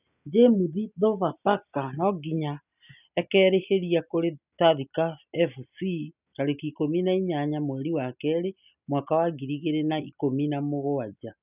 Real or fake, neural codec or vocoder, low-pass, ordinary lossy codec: real; none; 3.6 kHz; none